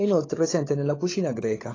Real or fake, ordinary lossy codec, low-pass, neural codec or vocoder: fake; AAC, 32 kbps; 7.2 kHz; codec, 16 kHz, 16 kbps, FunCodec, trained on LibriTTS, 50 frames a second